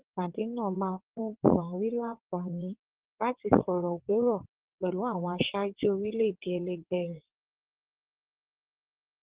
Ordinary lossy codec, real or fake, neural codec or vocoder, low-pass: Opus, 16 kbps; fake; vocoder, 22.05 kHz, 80 mel bands, WaveNeXt; 3.6 kHz